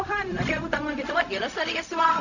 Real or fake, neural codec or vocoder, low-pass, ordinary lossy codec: fake; codec, 16 kHz, 0.4 kbps, LongCat-Audio-Codec; 7.2 kHz; none